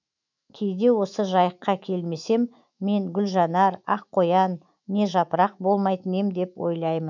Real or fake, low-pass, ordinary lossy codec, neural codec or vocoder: fake; 7.2 kHz; none; autoencoder, 48 kHz, 128 numbers a frame, DAC-VAE, trained on Japanese speech